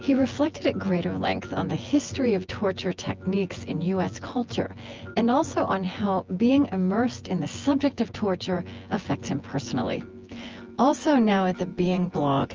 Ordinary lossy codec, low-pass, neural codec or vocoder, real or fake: Opus, 24 kbps; 7.2 kHz; vocoder, 24 kHz, 100 mel bands, Vocos; fake